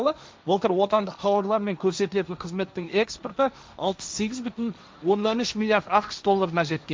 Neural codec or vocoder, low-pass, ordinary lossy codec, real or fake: codec, 16 kHz, 1.1 kbps, Voila-Tokenizer; none; none; fake